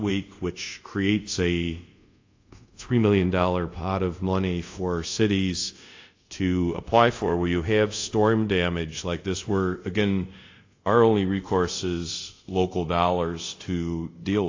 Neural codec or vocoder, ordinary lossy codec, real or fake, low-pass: codec, 24 kHz, 0.5 kbps, DualCodec; AAC, 48 kbps; fake; 7.2 kHz